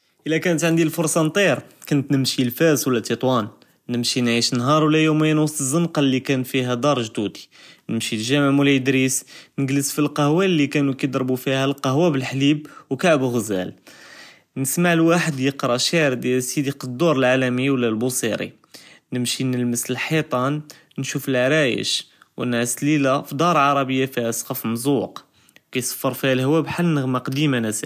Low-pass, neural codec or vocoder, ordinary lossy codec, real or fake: 14.4 kHz; none; none; real